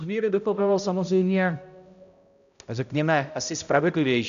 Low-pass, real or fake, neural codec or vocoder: 7.2 kHz; fake; codec, 16 kHz, 0.5 kbps, X-Codec, HuBERT features, trained on balanced general audio